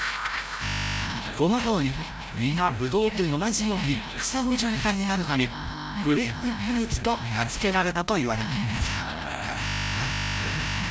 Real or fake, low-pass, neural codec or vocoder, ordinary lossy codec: fake; none; codec, 16 kHz, 0.5 kbps, FreqCodec, larger model; none